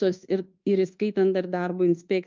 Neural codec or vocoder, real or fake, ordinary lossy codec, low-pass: codec, 24 kHz, 1.2 kbps, DualCodec; fake; Opus, 24 kbps; 7.2 kHz